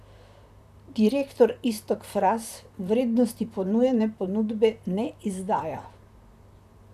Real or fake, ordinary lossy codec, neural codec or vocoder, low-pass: fake; none; vocoder, 44.1 kHz, 128 mel bands, Pupu-Vocoder; 14.4 kHz